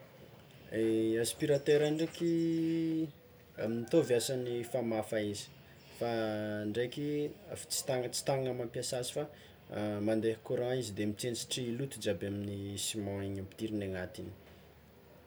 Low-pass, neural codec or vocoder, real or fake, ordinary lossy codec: none; none; real; none